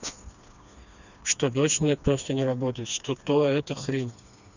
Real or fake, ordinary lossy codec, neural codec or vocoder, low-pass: fake; none; codec, 16 kHz, 2 kbps, FreqCodec, smaller model; 7.2 kHz